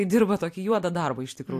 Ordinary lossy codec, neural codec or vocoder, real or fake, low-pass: AAC, 64 kbps; vocoder, 44.1 kHz, 128 mel bands every 256 samples, BigVGAN v2; fake; 14.4 kHz